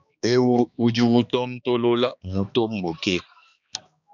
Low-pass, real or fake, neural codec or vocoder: 7.2 kHz; fake; codec, 16 kHz, 2 kbps, X-Codec, HuBERT features, trained on balanced general audio